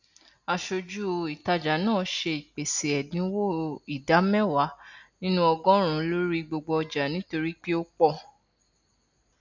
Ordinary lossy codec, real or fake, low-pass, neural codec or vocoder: none; real; 7.2 kHz; none